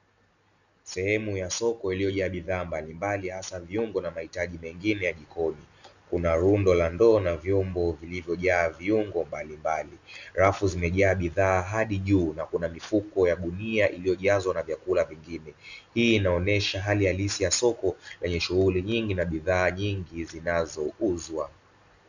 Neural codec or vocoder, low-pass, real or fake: none; 7.2 kHz; real